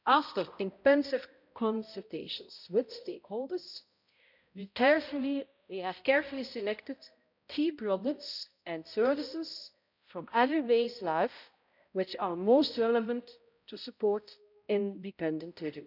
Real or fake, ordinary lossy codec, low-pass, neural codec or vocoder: fake; none; 5.4 kHz; codec, 16 kHz, 0.5 kbps, X-Codec, HuBERT features, trained on balanced general audio